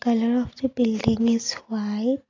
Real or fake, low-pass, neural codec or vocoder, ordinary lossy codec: real; 7.2 kHz; none; AAC, 32 kbps